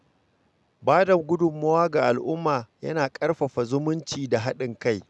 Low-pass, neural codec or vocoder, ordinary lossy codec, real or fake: none; none; none; real